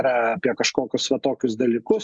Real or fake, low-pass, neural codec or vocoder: real; 9.9 kHz; none